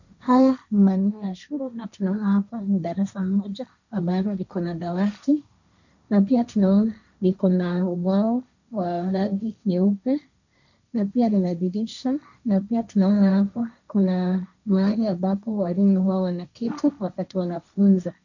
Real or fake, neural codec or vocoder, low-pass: fake; codec, 16 kHz, 1.1 kbps, Voila-Tokenizer; 7.2 kHz